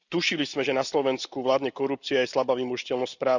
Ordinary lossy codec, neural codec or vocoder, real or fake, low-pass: none; none; real; 7.2 kHz